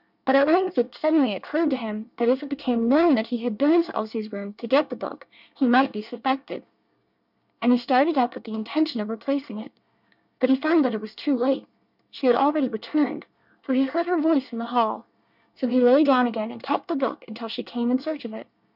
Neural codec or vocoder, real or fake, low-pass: codec, 24 kHz, 1 kbps, SNAC; fake; 5.4 kHz